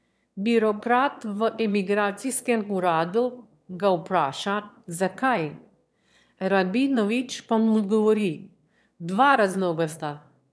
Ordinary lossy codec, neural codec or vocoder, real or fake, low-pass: none; autoencoder, 22.05 kHz, a latent of 192 numbers a frame, VITS, trained on one speaker; fake; none